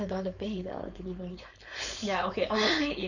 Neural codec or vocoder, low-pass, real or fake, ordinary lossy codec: codec, 16 kHz, 4.8 kbps, FACodec; 7.2 kHz; fake; none